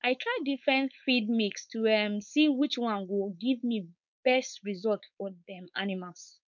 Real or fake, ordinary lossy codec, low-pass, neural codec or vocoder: fake; none; 7.2 kHz; codec, 16 kHz, 4.8 kbps, FACodec